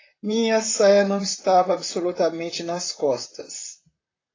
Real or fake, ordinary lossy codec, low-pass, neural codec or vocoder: fake; AAC, 32 kbps; 7.2 kHz; vocoder, 44.1 kHz, 128 mel bands, Pupu-Vocoder